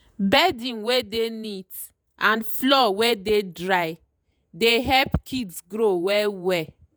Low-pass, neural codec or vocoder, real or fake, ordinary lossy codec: none; vocoder, 48 kHz, 128 mel bands, Vocos; fake; none